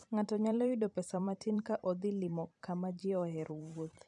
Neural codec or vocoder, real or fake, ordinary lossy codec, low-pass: vocoder, 44.1 kHz, 128 mel bands every 512 samples, BigVGAN v2; fake; none; 10.8 kHz